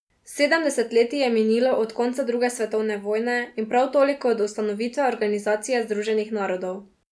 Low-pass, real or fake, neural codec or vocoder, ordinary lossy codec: none; real; none; none